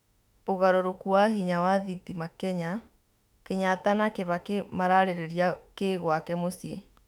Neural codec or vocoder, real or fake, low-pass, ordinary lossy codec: autoencoder, 48 kHz, 32 numbers a frame, DAC-VAE, trained on Japanese speech; fake; 19.8 kHz; none